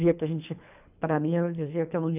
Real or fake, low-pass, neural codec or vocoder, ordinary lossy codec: fake; 3.6 kHz; codec, 24 kHz, 3 kbps, HILCodec; none